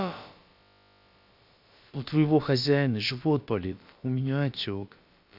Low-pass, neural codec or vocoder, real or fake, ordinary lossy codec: 5.4 kHz; codec, 16 kHz, about 1 kbps, DyCAST, with the encoder's durations; fake; Opus, 64 kbps